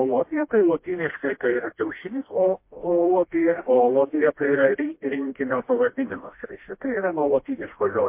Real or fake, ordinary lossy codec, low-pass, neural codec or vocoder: fake; MP3, 24 kbps; 3.6 kHz; codec, 16 kHz, 1 kbps, FreqCodec, smaller model